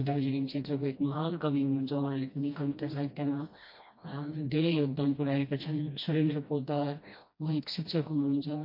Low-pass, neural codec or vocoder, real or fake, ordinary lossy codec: 5.4 kHz; codec, 16 kHz, 1 kbps, FreqCodec, smaller model; fake; MP3, 32 kbps